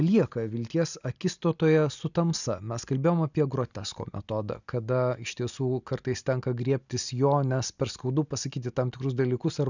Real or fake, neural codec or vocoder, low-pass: real; none; 7.2 kHz